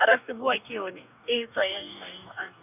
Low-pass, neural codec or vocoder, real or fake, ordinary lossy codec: 3.6 kHz; codec, 44.1 kHz, 2.6 kbps, DAC; fake; none